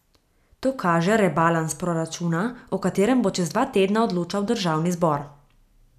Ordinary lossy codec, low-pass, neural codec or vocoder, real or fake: none; 14.4 kHz; none; real